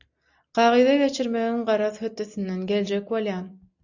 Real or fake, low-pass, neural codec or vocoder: real; 7.2 kHz; none